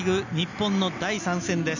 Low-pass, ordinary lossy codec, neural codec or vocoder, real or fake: 7.2 kHz; none; none; real